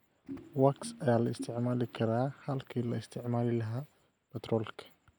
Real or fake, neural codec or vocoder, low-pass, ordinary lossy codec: real; none; none; none